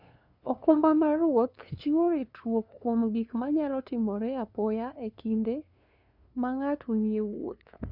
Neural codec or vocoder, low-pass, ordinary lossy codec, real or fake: codec, 16 kHz, 2 kbps, FunCodec, trained on LibriTTS, 25 frames a second; 5.4 kHz; none; fake